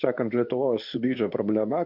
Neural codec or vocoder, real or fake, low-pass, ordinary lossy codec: codec, 24 kHz, 0.9 kbps, WavTokenizer, medium speech release version 2; fake; 5.4 kHz; MP3, 48 kbps